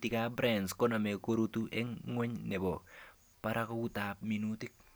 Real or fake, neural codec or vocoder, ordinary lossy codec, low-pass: real; none; none; none